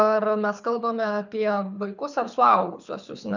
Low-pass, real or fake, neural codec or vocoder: 7.2 kHz; fake; codec, 24 kHz, 6 kbps, HILCodec